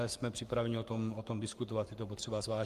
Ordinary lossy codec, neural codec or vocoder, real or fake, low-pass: Opus, 32 kbps; codec, 44.1 kHz, 7.8 kbps, Pupu-Codec; fake; 14.4 kHz